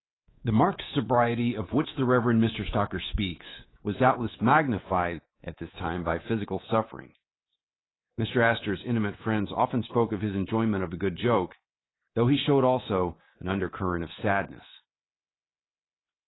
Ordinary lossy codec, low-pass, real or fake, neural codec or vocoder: AAC, 16 kbps; 7.2 kHz; fake; codec, 24 kHz, 3.1 kbps, DualCodec